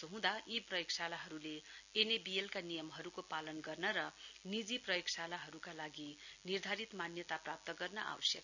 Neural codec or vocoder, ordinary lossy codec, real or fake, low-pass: none; none; real; 7.2 kHz